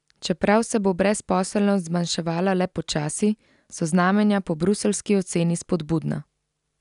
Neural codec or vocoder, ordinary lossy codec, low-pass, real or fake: none; none; 10.8 kHz; real